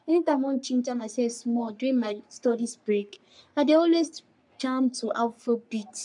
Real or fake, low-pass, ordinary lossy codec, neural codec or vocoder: fake; 10.8 kHz; none; codec, 44.1 kHz, 3.4 kbps, Pupu-Codec